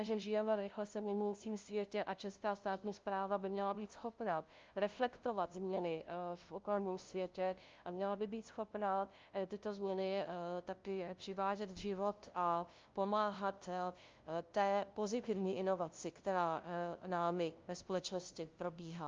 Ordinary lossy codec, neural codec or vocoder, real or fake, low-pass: Opus, 24 kbps; codec, 16 kHz, 0.5 kbps, FunCodec, trained on LibriTTS, 25 frames a second; fake; 7.2 kHz